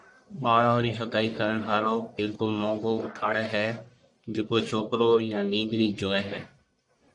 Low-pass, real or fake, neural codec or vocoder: 10.8 kHz; fake; codec, 44.1 kHz, 1.7 kbps, Pupu-Codec